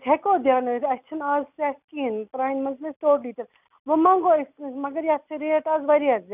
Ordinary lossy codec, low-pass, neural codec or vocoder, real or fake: none; 3.6 kHz; none; real